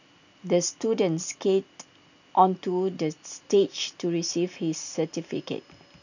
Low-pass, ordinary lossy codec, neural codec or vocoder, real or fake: 7.2 kHz; none; none; real